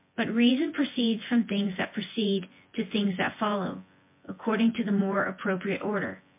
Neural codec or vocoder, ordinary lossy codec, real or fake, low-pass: vocoder, 24 kHz, 100 mel bands, Vocos; MP3, 24 kbps; fake; 3.6 kHz